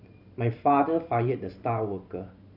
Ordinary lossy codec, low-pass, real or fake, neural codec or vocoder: none; 5.4 kHz; fake; vocoder, 44.1 kHz, 128 mel bands every 512 samples, BigVGAN v2